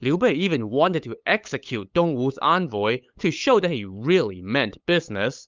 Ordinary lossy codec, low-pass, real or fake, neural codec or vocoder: Opus, 24 kbps; 7.2 kHz; fake; codec, 16 kHz, 8 kbps, FunCodec, trained on LibriTTS, 25 frames a second